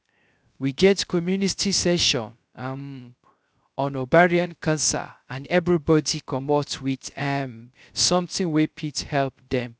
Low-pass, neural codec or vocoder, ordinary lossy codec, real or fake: none; codec, 16 kHz, 0.3 kbps, FocalCodec; none; fake